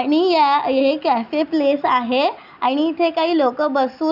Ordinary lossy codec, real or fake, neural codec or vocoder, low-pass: none; real; none; 5.4 kHz